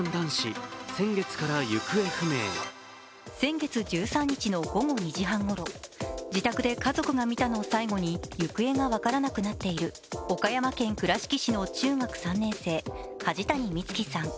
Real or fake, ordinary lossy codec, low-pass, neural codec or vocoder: real; none; none; none